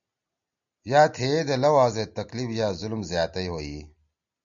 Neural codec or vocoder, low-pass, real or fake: none; 7.2 kHz; real